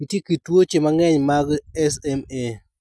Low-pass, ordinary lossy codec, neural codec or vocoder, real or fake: none; none; none; real